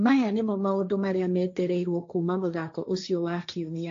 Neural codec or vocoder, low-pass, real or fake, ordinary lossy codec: codec, 16 kHz, 1.1 kbps, Voila-Tokenizer; 7.2 kHz; fake; AAC, 48 kbps